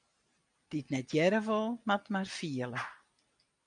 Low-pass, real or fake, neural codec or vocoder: 9.9 kHz; real; none